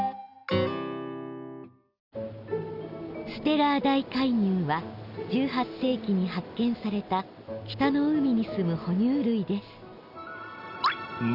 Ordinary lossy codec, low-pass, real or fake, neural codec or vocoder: none; 5.4 kHz; real; none